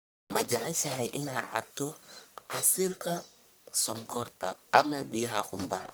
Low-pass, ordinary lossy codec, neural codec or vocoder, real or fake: none; none; codec, 44.1 kHz, 1.7 kbps, Pupu-Codec; fake